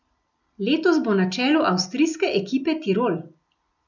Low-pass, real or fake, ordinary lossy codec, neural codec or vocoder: 7.2 kHz; real; none; none